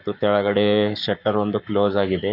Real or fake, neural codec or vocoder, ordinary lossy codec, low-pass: fake; codec, 44.1 kHz, 7.8 kbps, Pupu-Codec; none; 5.4 kHz